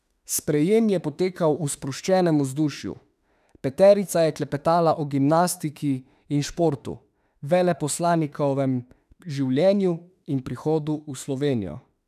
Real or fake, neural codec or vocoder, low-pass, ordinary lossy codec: fake; autoencoder, 48 kHz, 32 numbers a frame, DAC-VAE, trained on Japanese speech; 14.4 kHz; none